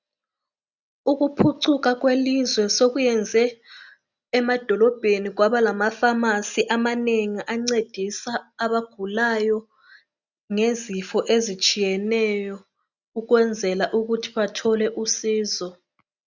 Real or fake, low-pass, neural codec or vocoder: real; 7.2 kHz; none